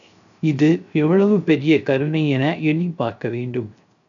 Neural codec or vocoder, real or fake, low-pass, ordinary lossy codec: codec, 16 kHz, 0.3 kbps, FocalCodec; fake; 7.2 kHz; MP3, 96 kbps